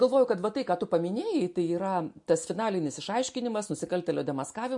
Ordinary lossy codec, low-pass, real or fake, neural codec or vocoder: MP3, 48 kbps; 10.8 kHz; real; none